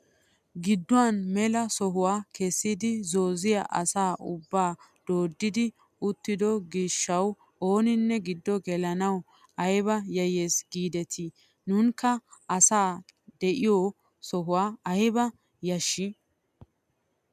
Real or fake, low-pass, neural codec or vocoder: real; 14.4 kHz; none